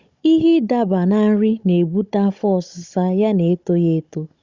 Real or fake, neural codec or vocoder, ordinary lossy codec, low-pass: fake; codec, 16 kHz, 16 kbps, FunCodec, trained on Chinese and English, 50 frames a second; Opus, 64 kbps; 7.2 kHz